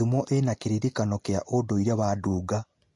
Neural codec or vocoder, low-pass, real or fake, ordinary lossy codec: none; 10.8 kHz; real; MP3, 48 kbps